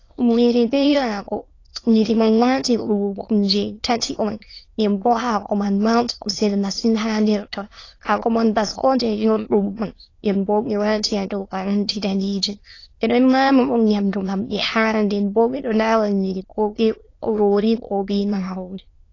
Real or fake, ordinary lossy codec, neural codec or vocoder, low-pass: fake; AAC, 32 kbps; autoencoder, 22.05 kHz, a latent of 192 numbers a frame, VITS, trained on many speakers; 7.2 kHz